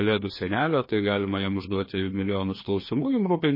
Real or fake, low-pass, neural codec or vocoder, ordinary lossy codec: fake; 5.4 kHz; codec, 16 kHz, 2 kbps, FreqCodec, larger model; MP3, 24 kbps